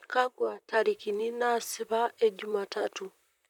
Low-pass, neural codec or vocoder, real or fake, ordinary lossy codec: 19.8 kHz; vocoder, 44.1 kHz, 128 mel bands, Pupu-Vocoder; fake; none